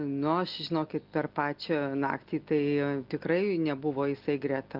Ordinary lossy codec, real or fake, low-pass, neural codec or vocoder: Opus, 24 kbps; real; 5.4 kHz; none